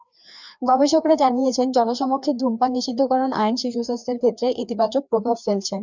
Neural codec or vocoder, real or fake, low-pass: codec, 16 kHz, 2 kbps, FreqCodec, larger model; fake; 7.2 kHz